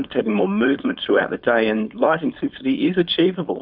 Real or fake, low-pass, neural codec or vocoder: fake; 5.4 kHz; codec, 16 kHz, 4.8 kbps, FACodec